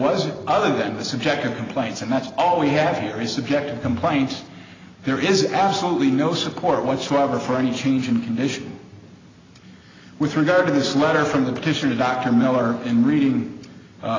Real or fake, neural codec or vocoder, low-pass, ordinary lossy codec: real; none; 7.2 kHz; AAC, 32 kbps